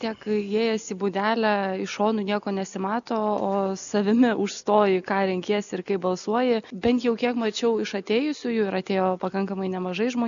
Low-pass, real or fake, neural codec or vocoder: 7.2 kHz; real; none